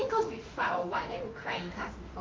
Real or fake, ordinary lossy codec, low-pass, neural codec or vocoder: fake; Opus, 24 kbps; 7.2 kHz; autoencoder, 48 kHz, 32 numbers a frame, DAC-VAE, trained on Japanese speech